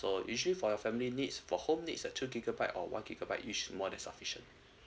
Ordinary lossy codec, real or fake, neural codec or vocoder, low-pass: none; real; none; none